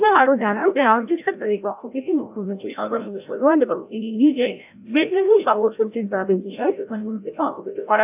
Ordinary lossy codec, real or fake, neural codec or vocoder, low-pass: AAC, 32 kbps; fake; codec, 16 kHz, 0.5 kbps, FreqCodec, larger model; 3.6 kHz